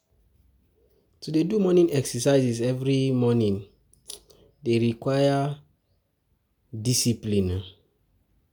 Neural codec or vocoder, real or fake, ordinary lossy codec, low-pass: none; real; none; none